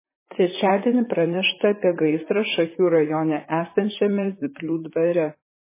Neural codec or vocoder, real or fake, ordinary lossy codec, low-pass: vocoder, 44.1 kHz, 128 mel bands, Pupu-Vocoder; fake; MP3, 16 kbps; 3.6 kHz